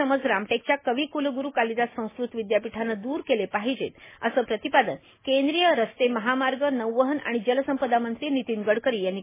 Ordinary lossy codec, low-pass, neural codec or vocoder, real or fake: MP3, 16 kbps; 3.6 kHz; none; real